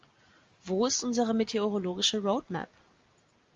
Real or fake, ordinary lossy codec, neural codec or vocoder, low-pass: real; Opus, 32 kbps; none; 7.2 kHz